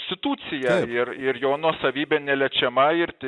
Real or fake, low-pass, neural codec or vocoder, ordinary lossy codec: real; 10.8 kHz; none; Opus, 64 kbps